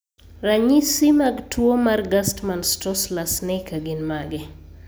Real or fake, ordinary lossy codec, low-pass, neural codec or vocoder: real; none; none; none